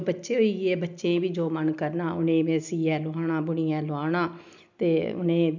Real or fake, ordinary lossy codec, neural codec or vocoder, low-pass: real; none; none; 7.2 kHz